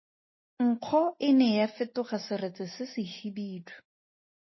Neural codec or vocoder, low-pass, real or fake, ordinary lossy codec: none; 7.2 kHz; real; MP3, 24 kbps